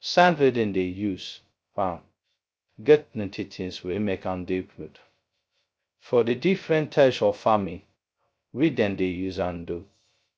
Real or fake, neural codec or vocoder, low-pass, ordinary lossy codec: fake; codec, 16 kHz, 0.2 kbps, FocalCodec; none; none